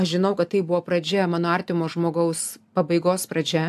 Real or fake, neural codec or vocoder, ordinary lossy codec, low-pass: real; none; AAC, 96 kbps; 14.4 kHz